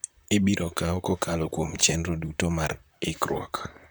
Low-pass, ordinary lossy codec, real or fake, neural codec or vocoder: none; none; fake; vocoder, 44.1 kHz, 128 mel bands, Pupu-Vocoder